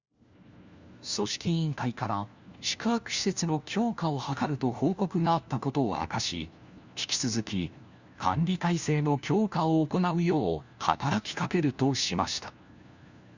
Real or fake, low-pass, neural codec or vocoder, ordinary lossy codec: fake; 7.2 kHz; codec, 16 kHz, 1 kbps, FunCodec, trained on LibriTTS, 50 frames a second; Opus, 64 kbps